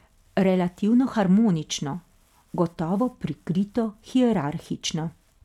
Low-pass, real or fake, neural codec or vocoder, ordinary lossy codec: 19.8 kHz; real; none; none